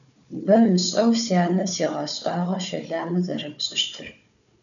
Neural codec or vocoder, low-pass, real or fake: codec, 16 kHz, 4 kbps, FunCodec, trained on Chinese and English, 50 frames a second; 7.2 kHz; fake